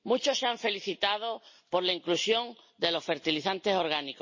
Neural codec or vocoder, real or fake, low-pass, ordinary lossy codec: none; real; 7.2 kHz; MP3, 32 kbps